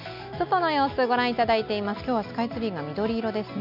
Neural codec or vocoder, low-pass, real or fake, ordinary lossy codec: none; 5.4 kHz; real; none